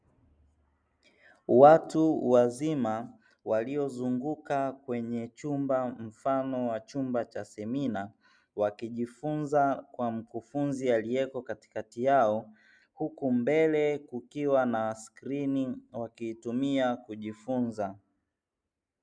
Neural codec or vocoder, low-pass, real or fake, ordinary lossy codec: none; 9.9 kHz; real; MP3, 96 kbps